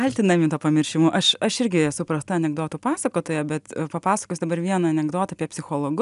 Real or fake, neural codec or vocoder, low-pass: real; none; 10.8 kHz